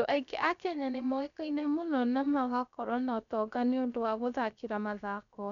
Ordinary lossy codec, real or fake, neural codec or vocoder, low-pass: none; fake; codec, 16 kHz, 0.7 kbps, FocalCodec; 7.2 kHz